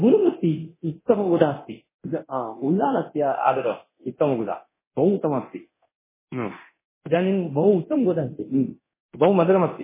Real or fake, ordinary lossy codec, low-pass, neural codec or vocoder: fake; MP3, 16 kbps; 3.6 kHz; codec, 24 kHz, 0.9 kbps, DualCodec